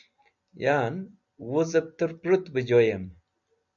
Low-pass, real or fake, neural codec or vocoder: 7.2 kHz; real; none